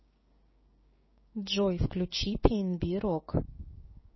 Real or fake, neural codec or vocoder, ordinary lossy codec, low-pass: fake; codec, 16 kHz, 6 kbps, DAC; MP3, 24 kbps; 7.2 kHz